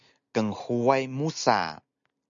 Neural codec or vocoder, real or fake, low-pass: none; real; 7.2 kHz